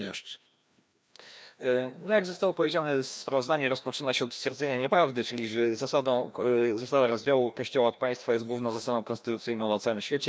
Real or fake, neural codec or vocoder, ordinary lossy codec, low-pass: fake; codec, 16 kHz, 1 kbps, FreqCodec, larger model; none; none